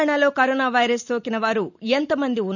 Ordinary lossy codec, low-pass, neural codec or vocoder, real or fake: none; 7.2 kHz; none; real